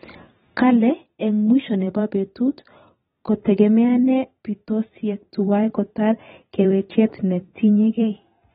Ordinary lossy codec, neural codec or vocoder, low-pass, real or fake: AAC, 16 kbps; vocoder, 44.1 kHz, 128 mel bands every 512 samples, BigVGAN v2; 19.8 kHz; fake